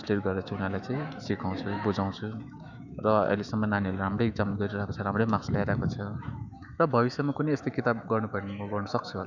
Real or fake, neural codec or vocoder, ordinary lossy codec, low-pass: fake; autoencoder, 48 kHz, 128 numbers a frame, DAC-VAE, trained on Japanese speech; none; 7.2 kHz